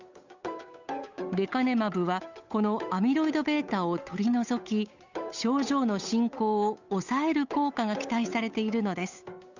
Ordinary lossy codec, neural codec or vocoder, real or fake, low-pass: none; codec, 16 kHz, 8 kbps, FunCodec, trained on Chinese and English, 25 frames a second; fake; 7.2 kHz